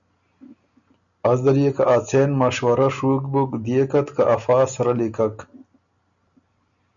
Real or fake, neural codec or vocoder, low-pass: real; none; 7.2 kHz